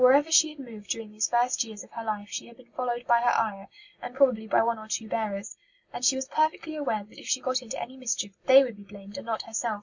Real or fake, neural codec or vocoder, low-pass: real; none; 7.2 kHz